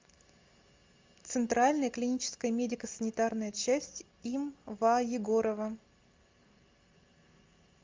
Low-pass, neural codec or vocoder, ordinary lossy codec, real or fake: 7.2 kHz; none; Opus, 32 kbps; real